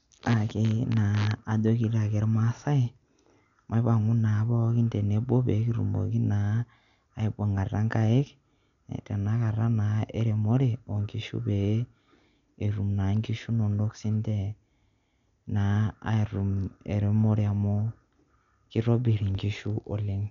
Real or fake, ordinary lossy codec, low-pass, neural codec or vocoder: real; none; 7.2 kHz; none